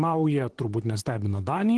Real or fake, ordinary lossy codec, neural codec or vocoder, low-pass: fake; Opus, 16 kbps; vocoder, 44.1 kHz, 128 mel bands, Pupu-Vocoder; 10.8 kHz